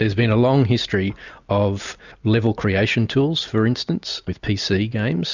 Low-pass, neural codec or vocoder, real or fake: 7.2 kHz; none; real